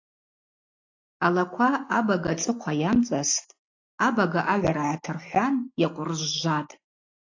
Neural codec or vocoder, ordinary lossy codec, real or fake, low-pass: none; AAC, 48 kbps; real; 7.2 kHz